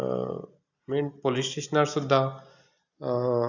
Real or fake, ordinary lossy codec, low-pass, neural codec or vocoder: real; none; 7.2 kHz; none